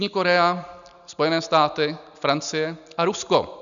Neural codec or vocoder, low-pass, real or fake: none; 7.2 kHz; real